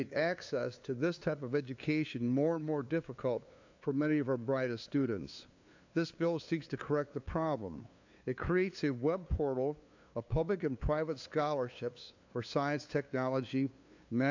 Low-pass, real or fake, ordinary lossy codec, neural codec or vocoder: 7.2 kHz; fake; AAC, 48 kbps; codec, 16 kHz, 2 kbps, FunCodec, trained on LibriTTS, 25 frames a second